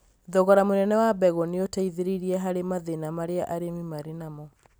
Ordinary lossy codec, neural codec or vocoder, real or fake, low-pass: none; none; real; none